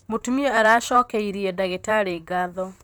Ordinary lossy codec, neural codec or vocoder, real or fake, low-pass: none; vocoder, 44.1 kHz, 128 mel bands, Pupu-Vocoder; fake; none